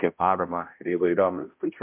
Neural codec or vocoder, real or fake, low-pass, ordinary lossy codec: codec, 16 kHz, 0.5 kbps, X-Codec, HuBERT features, trained on balanced general audio; fake; 3.6 kHz; MP3, 32 kbps